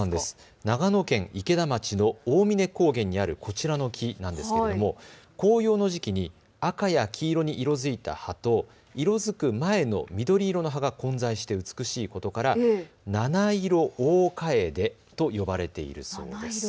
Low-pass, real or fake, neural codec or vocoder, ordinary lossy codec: none; real; none; none